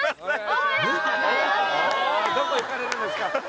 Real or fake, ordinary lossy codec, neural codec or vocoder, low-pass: real; none; none; none